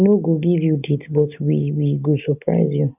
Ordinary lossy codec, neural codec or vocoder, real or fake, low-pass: none; none; real; 3.6 kHz